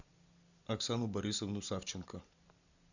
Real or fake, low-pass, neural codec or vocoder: real; 7.2 kHz; none